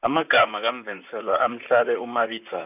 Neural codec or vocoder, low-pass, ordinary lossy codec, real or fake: vocoder, 44.1 kHz, 128 mel bands, Pupu-Vocoder; 3.6 kHz; none; fake